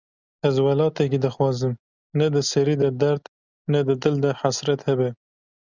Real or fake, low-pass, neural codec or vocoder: real; 7.2 kHz; none